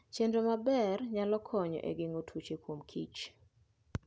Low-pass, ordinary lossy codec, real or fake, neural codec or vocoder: none; none; real; none